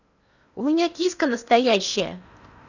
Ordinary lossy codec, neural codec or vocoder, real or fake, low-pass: none; codec, 16 kHz in and 24 kHz out, 0.8 kbps, FocalCodec, streaming, 65536 codes; fake; 7.2 kHz